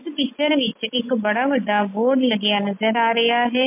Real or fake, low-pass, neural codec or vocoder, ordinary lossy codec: real; 3.6 kHz; none; MP3, 24 kbps